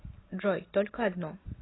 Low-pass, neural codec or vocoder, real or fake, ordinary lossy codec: 7.2 kHz; none; real; AAC, 16 kbps